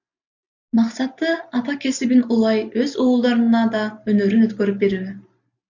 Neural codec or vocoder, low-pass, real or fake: none; 7.2 kHz; real